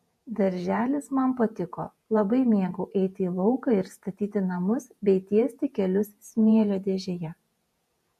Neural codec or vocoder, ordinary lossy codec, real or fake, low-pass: vocoder, 48 kHz, 128 mel bands, Vocos; MP3, 64 kbps; fake; 14.4 kHz